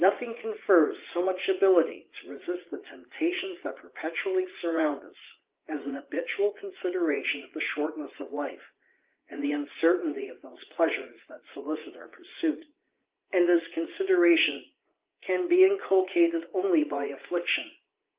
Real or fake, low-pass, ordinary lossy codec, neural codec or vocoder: fake; 3.6 kHz; Opus, 32 kbps; vocoder, 44.1 kHz, 80 mel bands, Vocos